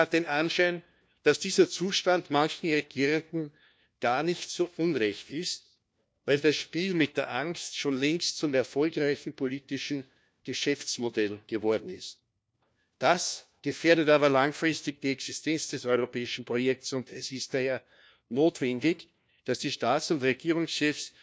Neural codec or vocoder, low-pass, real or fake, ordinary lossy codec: codec, 16 kHz, 1 kbps, FunCodec, trained on LibriTTS, 50 frames a second; none; fake; none